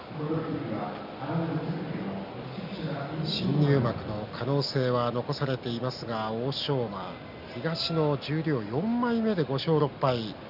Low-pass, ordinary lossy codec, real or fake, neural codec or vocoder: 5.4 kHz; none; real; none